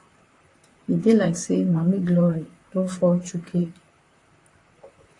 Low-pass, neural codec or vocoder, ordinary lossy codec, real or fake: 10.8 kHz; vocoder, 44.1 kHz, 128 mel bands, Pupu-Vocoder; AAC, 48 kbps; fake